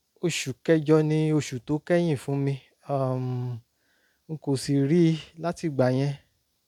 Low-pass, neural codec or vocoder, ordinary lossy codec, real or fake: 19.8 kHz; none; none; real